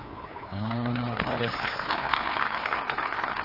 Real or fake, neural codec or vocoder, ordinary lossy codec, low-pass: fake; codec, 16 kHz, 2 kbps, FreqCodec, larger model; AAC, 32 kbps; 5.4 kHz